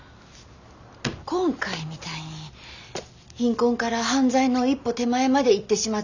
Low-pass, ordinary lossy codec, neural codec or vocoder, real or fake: 7.2 kHz; none; none; real